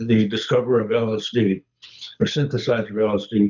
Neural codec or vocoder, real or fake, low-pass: codec, 24 kHz, 6 kbps, HILCodec; fake; 7.2 kHz